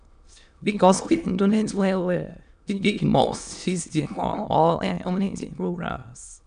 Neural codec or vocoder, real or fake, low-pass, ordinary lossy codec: autoencoder, 22.05 kHz, a latent of 192 numbers a frame, VITS, trained on many speakers; fake; 9.9 kHz; AAC, 96 kbps